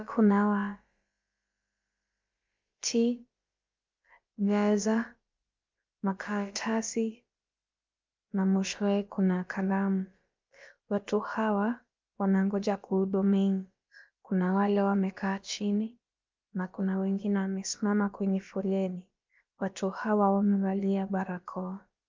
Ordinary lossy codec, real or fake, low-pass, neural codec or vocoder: Opus, 32 kbps; fake; 7.2 kHz; codec, 16 kHz, about 1 kbps, DyCAST, with the encoder's durations